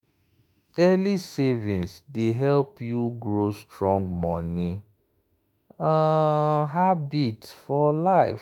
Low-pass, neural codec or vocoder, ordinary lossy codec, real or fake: 19.8 kHz; autoencoder, 48 kHz, 32 numbers a frame, DAC-VAE, trained on Japanese speech; none; fake